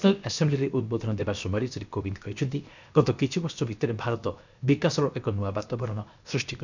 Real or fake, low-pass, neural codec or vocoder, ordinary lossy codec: fake; 7.2 kHz; codec, 16 kHz, 0.7 kbps, FocalCodec; none